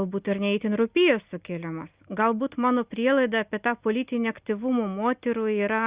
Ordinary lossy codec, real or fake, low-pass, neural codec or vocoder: Opus, 64 kbps; real; 3.6 kHz; none